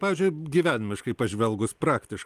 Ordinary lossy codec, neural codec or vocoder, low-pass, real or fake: Opus, 32 kbps; none; 14.4 kHz; real